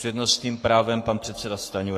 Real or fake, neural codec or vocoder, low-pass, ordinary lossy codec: fake; codec, 44.1 kHz, 7.8 kbps, Pupu-Codec; 14.4 kHz; AAC, 48 kbps